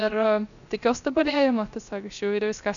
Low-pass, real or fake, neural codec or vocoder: 7.2 kHz; fake; codec, 16 kHz, 0.3 kbps, FocalCodec